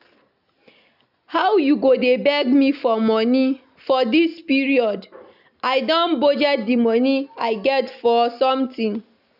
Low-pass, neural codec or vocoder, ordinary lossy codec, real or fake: 5.4 kHz; none; none; real